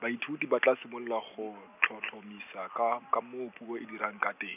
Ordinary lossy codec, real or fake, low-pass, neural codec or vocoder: none; real; 3.6 kHz; none